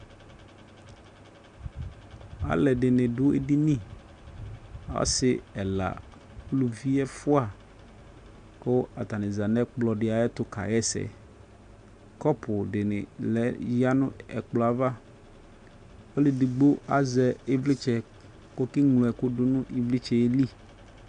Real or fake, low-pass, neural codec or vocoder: real; 9.9 kHz; none